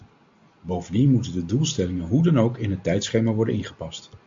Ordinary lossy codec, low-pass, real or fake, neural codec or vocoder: MP3, 48 kbps; 7.2 kHz; real; none